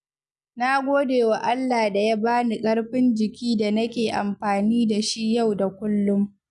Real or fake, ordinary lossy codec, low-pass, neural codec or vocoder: real; none; none; none